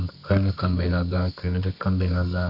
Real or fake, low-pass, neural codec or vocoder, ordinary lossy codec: fake; 5.4 kHz; codec, 24 kHz, 0.9 kbps, WavTokenizer, medium music audio release; MP3, 48 kbps